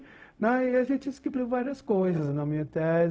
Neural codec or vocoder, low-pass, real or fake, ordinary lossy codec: codec, 16 kHz, 0.4 kbps, LongCat-Audio-Codec; none; fake; none